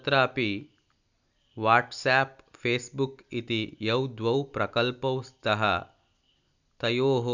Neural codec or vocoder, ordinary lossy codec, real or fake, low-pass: none; none; real; 7.2 kHz